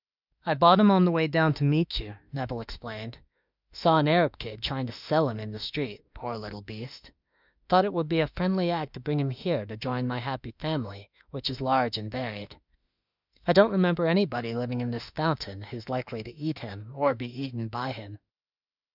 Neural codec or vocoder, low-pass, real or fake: autoencoder, 48 kHz, 32 numbers a frame, DAC-VAE, trained on Japanese speech; 5.4 kHz; fake